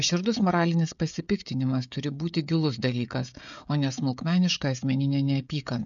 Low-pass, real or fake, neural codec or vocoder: 7.2 kHz; fake; codec, 16 kHz, 16 kbps, FreqCodec, smaller model